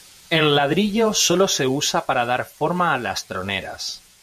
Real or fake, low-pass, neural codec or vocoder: fake; 14.4 kHz; vocoder, 48 kHz, 128 mel bands, Vocos